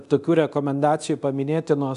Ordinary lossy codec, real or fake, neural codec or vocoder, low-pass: MP3, 96 kbps; fake; codec, 24 kHz, 0.9 kbps, DualCodec; 10.8 kHz